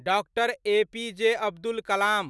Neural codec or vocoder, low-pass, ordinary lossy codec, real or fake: none; none; none; real